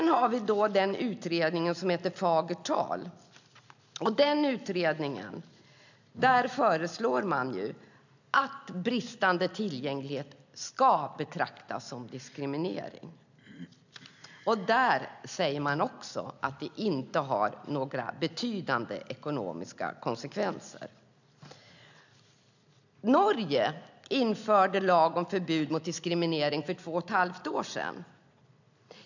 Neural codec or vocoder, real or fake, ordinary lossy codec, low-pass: none; real; none; 7.2 kHz